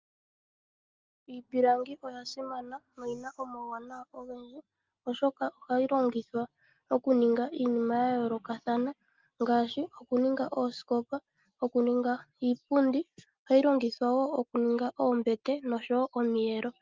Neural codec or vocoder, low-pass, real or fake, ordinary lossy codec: none; 7.2 kHz; real; Opus, 32 kbps